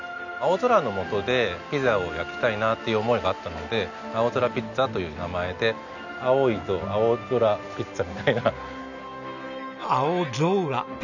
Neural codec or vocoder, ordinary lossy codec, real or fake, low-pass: none; none; real; 7.2 kHz